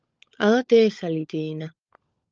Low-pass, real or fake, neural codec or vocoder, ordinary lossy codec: 7.2 kHz; fake; codec, 16 kHz, 16 kbps, FunCodec, trained on LibriTTS, 50 frames a second; Opus, 24 kbps